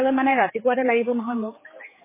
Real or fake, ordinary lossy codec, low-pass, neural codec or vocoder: fake; MP3, 16 kbps; 3.6 kHz; codec, 16 kHz, 4 kbps, X-Codec, HuBERT features, trained on general audio